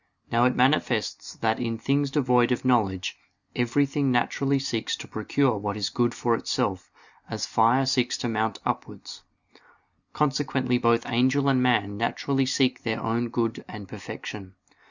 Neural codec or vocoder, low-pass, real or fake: none; 7.2 kHz; real